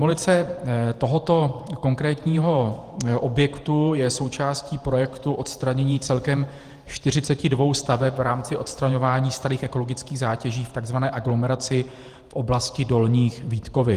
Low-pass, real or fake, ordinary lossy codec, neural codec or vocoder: 14.4 kHz; fake; Opus, 24 kbps; vocoder, 48 kHz, 128 mel bands, Vocos